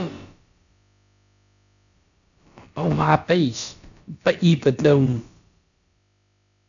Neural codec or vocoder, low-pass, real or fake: codec, 16 kHz, about 1 kbps, DyCAST, with the encoder's durations; 7.2 kHz; fake